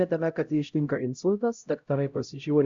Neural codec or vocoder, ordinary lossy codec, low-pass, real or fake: codec, 16 kHz, 0.5 kbps, X-Codec, HuBERT features, trained on LibriSpeech; Opus, 64 kbps; 7.2 kHz; fake